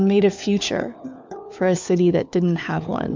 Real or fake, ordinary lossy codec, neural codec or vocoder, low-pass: fake; MP3, 64 kbps; codec, 16 kHz, 4 kbps, FunCodec, trained on LibriTTS, 50 frames a second; 7.2 kHz